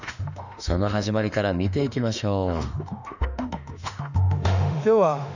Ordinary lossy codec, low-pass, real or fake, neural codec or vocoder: none; 7.2 kHz; fake; autoencoder, 48 kHz, 32 numbers a frame, DAC-VAE, trained on Japanese speech